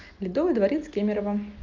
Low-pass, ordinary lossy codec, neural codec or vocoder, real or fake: 7.2 kHz; Opus, 24 kbps; none; real